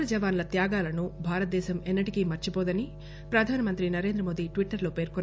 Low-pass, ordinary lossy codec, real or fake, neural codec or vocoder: none; none; real; none